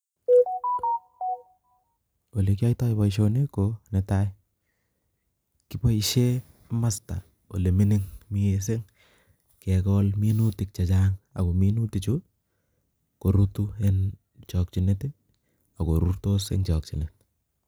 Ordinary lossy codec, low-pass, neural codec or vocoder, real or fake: none; none; none; real